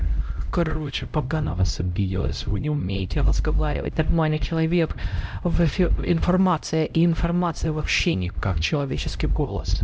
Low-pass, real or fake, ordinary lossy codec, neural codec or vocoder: none; fake; none; codec, 16 kHz, 0.5 kbps, X-Codec, HuBERT features, trained on LibriSpeech